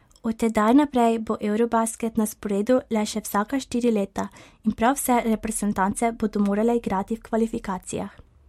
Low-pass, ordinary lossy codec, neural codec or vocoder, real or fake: 19.8 kHz; MP3, 64 kbps; none; real